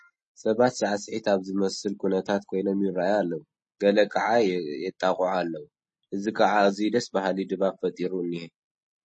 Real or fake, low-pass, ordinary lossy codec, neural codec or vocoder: real; 9.9 kHz; MP3, 32 kbps; none